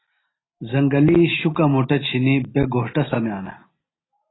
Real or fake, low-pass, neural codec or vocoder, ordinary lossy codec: real; 7.2 kHz; none; AAC, 16 kbps